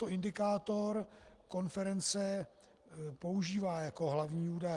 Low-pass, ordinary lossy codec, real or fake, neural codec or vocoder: 10.8 kHz; Opus, 24 kbps; real; none